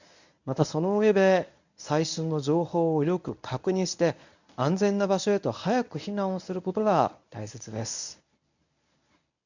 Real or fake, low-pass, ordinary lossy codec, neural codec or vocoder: fake; 7.2 kHz; none; codec, 24 kHz, 0.9 kbps, WavTokenizer, medium speech release version 1